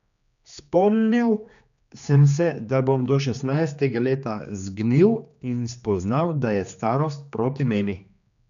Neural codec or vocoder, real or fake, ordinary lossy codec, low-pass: codec, 16 kHz, 2 kbps, X-Codec, HuBERT features, trained on general audio; fake; AAC, 96 kbps; 7.2 kHz